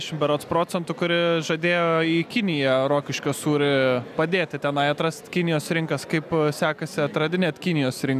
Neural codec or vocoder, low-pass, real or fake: none; 14.4 kHz; real